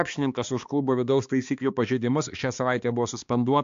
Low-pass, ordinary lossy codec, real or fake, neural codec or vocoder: 7.2 kHz; AAC, 64 kbps; fake; codec, 16 kHz, 2 kbps, X-Codec, HuBERT features, trained on balanced general audio